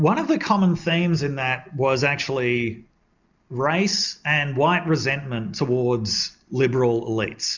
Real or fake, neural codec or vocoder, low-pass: real; none; 7.2 kHz